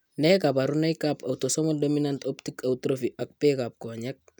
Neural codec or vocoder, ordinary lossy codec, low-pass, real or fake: none; none; none; real